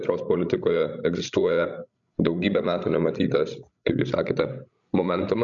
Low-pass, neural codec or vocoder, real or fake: 7.2 kHz; codec, 16 kHz, 16 kbps, FreqCodec, larger model; fake